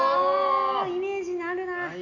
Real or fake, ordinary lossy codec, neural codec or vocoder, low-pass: real; none; none; 7.2 kHz